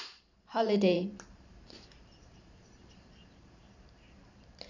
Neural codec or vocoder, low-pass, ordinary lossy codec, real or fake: vocoder, 44.1 kHz, 128 mel bands every 512 samples, BigVGAN v2; 7.2 kHz; none; fake